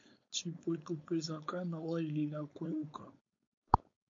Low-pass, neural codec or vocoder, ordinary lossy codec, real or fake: 7.2 kHz; codec, 16 kHz, 4.8 kbps, FACodec; MP3, 48 kbps; fake